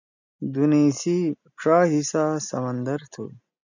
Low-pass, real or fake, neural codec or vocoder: 7.2 kHz; real; none